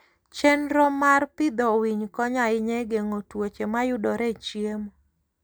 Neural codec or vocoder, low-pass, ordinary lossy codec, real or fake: none; none; none; real